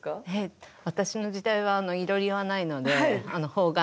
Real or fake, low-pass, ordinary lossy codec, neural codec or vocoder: real; none; none; none